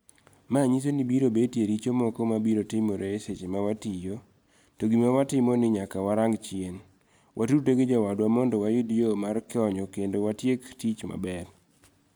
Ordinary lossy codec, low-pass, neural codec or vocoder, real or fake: none; none; none; real